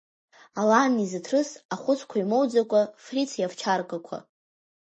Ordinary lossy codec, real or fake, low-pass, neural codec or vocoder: MP3, 32 kbps; real; 10.8 kHz; none